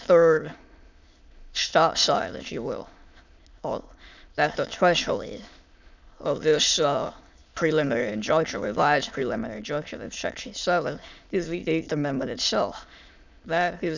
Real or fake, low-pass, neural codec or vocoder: fake; 7.2 kHz; autoencoder, 22.05 kHz, a latent of 192 numbers a frame, VITS, trained on many speakers